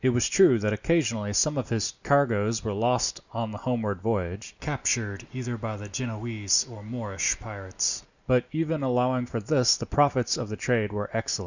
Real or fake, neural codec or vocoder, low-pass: real; none; 7.2 kHz